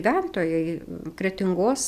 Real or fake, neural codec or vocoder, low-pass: real; none; 14.4 kHz